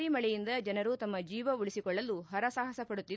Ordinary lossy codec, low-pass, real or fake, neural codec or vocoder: none; none; real; none